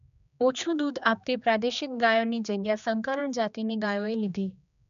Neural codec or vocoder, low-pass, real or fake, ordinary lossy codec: codec, 16 kHz, 2 kbps, X-Codec, HuBERT features, trained on general audio; 7.2 kHz; fake; none